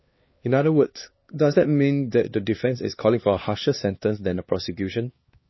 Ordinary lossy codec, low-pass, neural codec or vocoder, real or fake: MP3, 24 kbps; 7.2 kHz; codec, 16 kHz, 2 kbps, X-Codec, HuBERT features, trained on LibriSpeech; fake